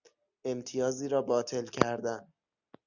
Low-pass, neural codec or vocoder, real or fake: 7.2 kHz; none; real